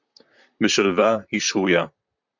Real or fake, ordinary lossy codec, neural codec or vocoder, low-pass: fake; MP3, 64 kbps; vocoder, 44.1 kHz, 128 mel bands, Pupu-Vocoder; 7.2 kHz